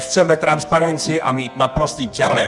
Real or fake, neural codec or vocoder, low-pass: fake; codec, 24 kHz, 0.9 kbps, WavTokenizer, medium music audio release; 10.8 kHz